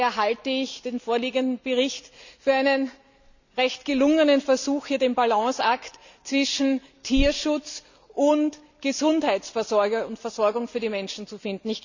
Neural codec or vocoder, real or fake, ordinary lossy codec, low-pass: none; real; none; 7.2 kHz